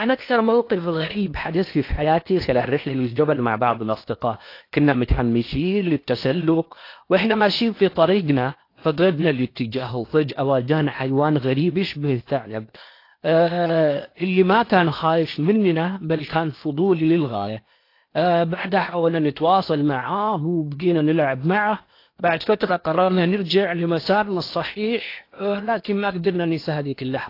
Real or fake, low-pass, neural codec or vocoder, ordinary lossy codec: fake; 5.4 kHz; codec, 16 kHz in and 24 kHz out, 0.8 kbps, FocalCodec, streaming, 65536 codes; AAC, 32 kbps